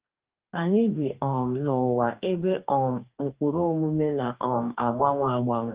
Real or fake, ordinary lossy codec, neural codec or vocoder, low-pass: fake; Opus, 32 kbps; codec, 44.1 kHz, 2.6 kbps, DAC; 3.6 kHz